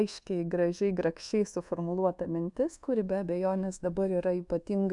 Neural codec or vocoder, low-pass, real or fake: codec, 24 kHz, 1.2 kbps, DualCodec; 10.8 kHz; fake